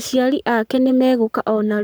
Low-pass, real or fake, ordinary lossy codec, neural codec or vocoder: none; fake; none; codec, 44.1 kHz, 7.8 kbps, Pupu-Codec